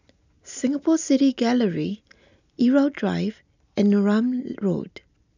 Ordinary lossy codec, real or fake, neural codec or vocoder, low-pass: none; real; none; 7.2 kHz